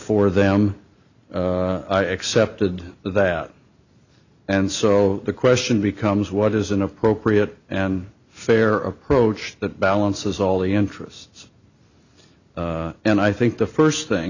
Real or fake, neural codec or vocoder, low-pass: real; none; 7.2 kHz